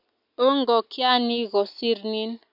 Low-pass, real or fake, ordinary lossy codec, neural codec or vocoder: 5.4 kHz; real; MP3, 48 kbps; none